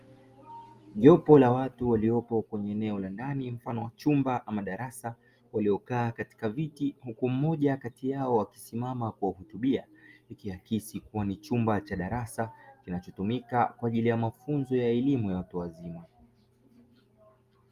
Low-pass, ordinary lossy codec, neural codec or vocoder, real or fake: 14.4 kHz; Opus, 32 kbps; none; real